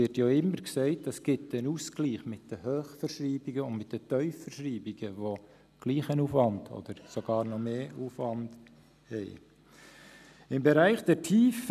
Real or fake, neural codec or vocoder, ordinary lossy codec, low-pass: real; none; none; 14.4 kHz